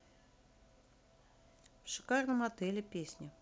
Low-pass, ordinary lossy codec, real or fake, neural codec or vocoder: none; none; real; none